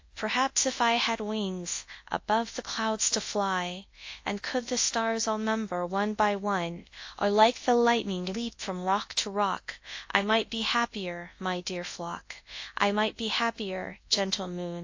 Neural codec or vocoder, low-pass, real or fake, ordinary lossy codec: codec, 24 kHz, 0.9 kbps, WavTokenizer, large speech release; 7.2 kHz; fake; AAC, 48 kbps